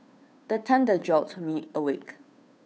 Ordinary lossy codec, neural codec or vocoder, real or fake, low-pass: none; codec, 16 kHz, 2 kbps, FunCodec, trained on Chinese and English, 25 frames a second; fake; none